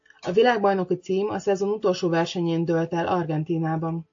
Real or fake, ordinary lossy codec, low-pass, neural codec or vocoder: real; AAC, 48 kbps; 7.2 kHz; none